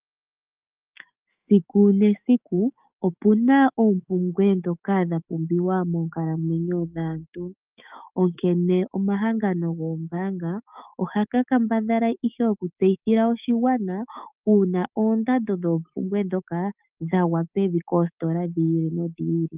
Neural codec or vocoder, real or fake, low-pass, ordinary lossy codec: autoencoder, 48 kHz, 128 numbers a frame, DAC-VAE, trained on Japanese speech; fake; 3.6 kHz; Opus, 24 kbps